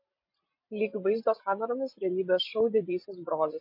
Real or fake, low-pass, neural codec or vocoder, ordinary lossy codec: real; 5.4 kHz; none; MP3, 32 kbps